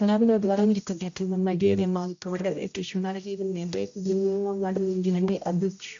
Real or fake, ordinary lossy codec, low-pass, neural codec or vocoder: fake; AAC, 64 kbps; 7.2 kHz; codec, 16 kHz, 0.5 kbps, X-Codec, HuBERT features, trained on general audio